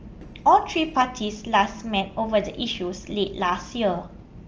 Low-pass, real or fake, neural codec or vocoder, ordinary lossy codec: 7.2 kHz; real; none; Opus, 24 kbps